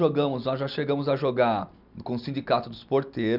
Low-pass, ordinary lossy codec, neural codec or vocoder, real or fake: 5.4 kHz; none; none; real